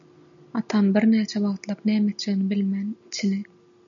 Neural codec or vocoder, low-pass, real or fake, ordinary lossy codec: none; 7.2 kHz; real; MP3, 96 kbps